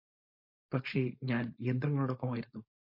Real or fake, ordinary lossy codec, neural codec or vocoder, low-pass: fake; MP3, 32 kbps; codec, 16 kHz, 4.8 kbps, FACodec; 5.4 kHz